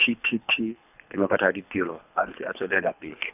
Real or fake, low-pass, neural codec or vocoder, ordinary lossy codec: fake; 3.6 kHz; codec, 24 kHz, 3 kbps, HILCodec; none